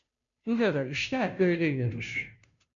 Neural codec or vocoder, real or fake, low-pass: codec, 16 kHz, 0.5 kbps, FunCodec, trained on Chinese and English, 25 frames a second; fake; 7.2 kHz